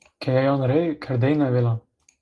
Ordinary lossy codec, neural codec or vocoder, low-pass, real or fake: Opus, 24 kbps; none; 10.8 kHz; real